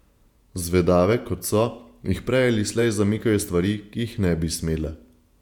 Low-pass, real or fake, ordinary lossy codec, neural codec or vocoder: 19.8 kHz; fake; none; vocoder, 48 kHz, 128 mel bands, Vocos